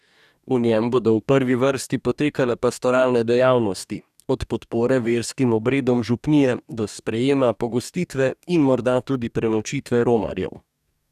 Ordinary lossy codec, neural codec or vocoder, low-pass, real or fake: none; codec, 44.1 kHz, 2.6 kbps, DAC; 14.4 kHz; fake